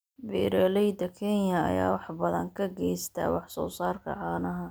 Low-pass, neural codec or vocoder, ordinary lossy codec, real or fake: none; none; none; real